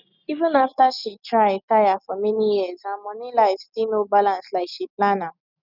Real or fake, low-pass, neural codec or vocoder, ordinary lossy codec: real; 5.4 kHz; none; none